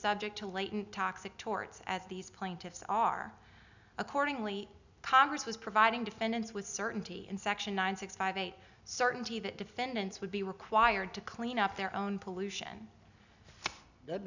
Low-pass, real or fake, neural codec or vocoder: 7.2 kHz; real; none